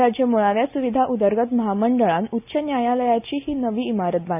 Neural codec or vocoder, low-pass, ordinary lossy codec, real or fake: none; 3.6 kHz; none; real